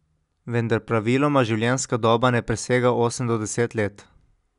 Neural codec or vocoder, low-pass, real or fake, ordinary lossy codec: none; 10.8 kHz; real; none